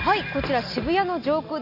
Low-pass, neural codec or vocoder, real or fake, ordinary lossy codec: 5.4 kHz; none; real; none